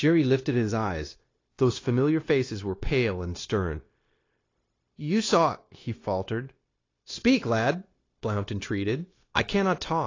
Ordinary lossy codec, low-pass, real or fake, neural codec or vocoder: AAC, 32 kbps; 7.2 kHz; fake; codec, 16 kHz, 0.9 kbps, LongCat-Audio-Codec